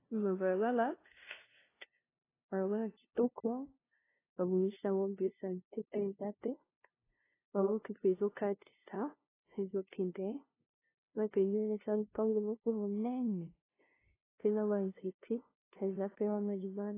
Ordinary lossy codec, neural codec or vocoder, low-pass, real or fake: AAC, 16 kbps; codec, 16 kHz, 0.5 kbps, FunCodec, trained on LibriTTS, 25 frames a second; 3.6 kHz; fake